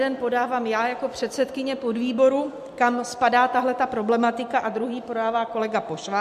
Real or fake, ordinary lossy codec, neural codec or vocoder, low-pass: real; MP3, 64 kbps; none; 14.4 kHz